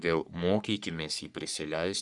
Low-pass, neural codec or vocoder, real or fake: 10.8 kHz; codec, 44.1 kHz, 3.4 kbps, Pupu-Codec; fake